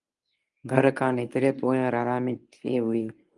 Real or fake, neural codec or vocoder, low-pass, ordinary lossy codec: fake; codec, 24 kHz, 0.9 kbps, WavTokenizer, medium speech release version 1; 10.8 kHz; Opus, 32 kbps